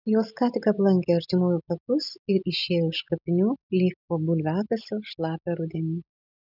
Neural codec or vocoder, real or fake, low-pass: none; real; 5.4 kHz